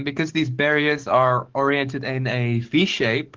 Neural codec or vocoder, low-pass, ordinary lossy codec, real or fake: codec, 44.1 kHz, 7.8 kbps, DAC; 7.2 kHz; Opus, 16 kbps; fake